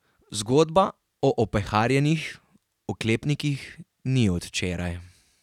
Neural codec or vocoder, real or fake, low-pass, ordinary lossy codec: none; real; 19.8 kHz; none